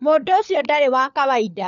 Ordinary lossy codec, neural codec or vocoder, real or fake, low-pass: none; codec, 16 kHz, 4 kbps, FunCodec, trained on LibriTTS, 50 frames a second; fake; 7.2 kHz